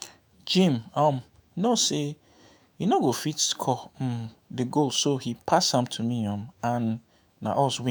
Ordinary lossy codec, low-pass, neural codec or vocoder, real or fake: none; none; autoencoder, 48 kHz, 128 numbers a frame, DAC-VAE, trained on Japanese speech; fake